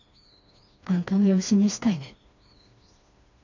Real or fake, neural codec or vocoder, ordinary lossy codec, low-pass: fake; codec, 16 kHz, 2 kbps, FreqCodec, smaller model; none; 7.2 kHz